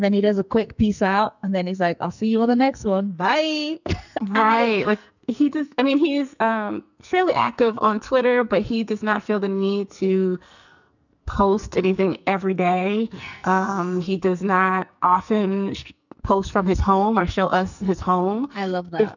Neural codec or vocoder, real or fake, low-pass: codec, 44.1 kHz, 2.6 kbps, SNAC; fake; 7.2 kHz